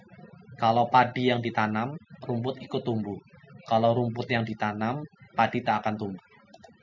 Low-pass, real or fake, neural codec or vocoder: 7.2 kHz; real; none